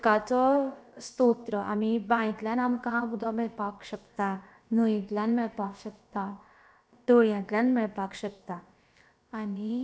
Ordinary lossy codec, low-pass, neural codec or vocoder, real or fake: none; none; codec, 16 kHz, about 1 kbps, DyCAST, with the encoder's durations; fake